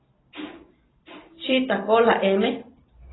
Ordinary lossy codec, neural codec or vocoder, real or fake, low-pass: AAC, 16 kbps; none; real; 7.2 kHz